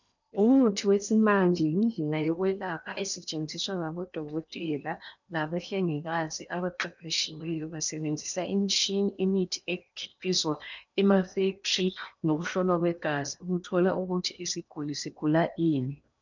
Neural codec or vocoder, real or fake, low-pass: codec, 16 kHz in and 24 kHz out, 0.8 kbps, FocalCodec, streaming, 65536 codes; fake; 7.2 kHz